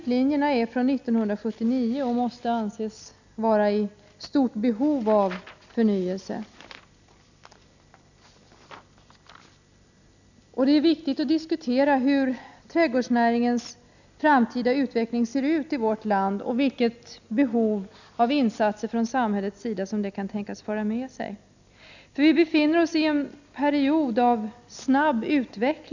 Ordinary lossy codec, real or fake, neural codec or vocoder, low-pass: none; real; none; 7.2 kHz